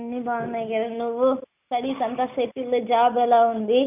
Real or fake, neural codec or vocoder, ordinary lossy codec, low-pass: real; none; none; 3.6 kHz